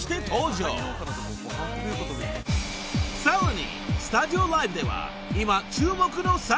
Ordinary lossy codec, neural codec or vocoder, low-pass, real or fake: none; none; none; real